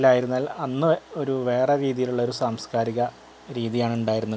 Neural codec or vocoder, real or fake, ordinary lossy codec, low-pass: none; real; none; none